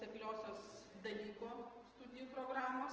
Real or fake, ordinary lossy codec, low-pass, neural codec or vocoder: real; Opus, 16 kbps; 7.2 kHz; none